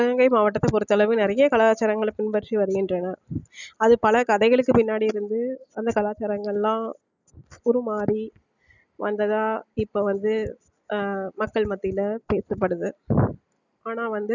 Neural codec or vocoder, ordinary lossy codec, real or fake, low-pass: none; none; real; 7.2 kHz